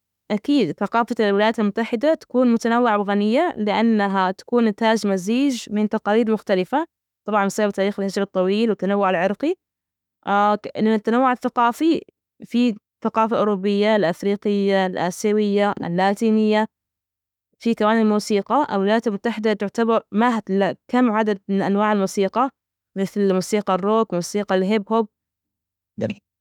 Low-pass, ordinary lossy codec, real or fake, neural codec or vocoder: 19.8 kHz; none; fake; autoencoder, 48 kHz, 32 numbers a frame, DAC-VAE, trained on Japanese speech